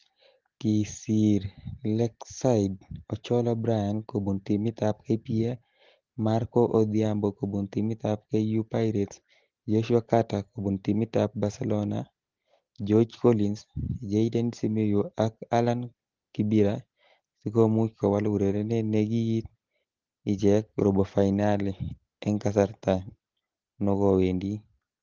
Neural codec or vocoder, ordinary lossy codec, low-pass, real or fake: none; Opus, 16 kbps; 7.2 kHz; real